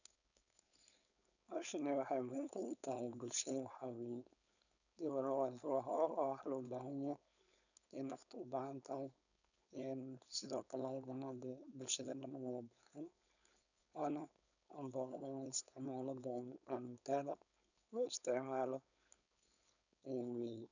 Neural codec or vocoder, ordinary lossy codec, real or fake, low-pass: codec, 16 kHz, 4.8 kbps, FACodec; none; fake; 7.2 kHz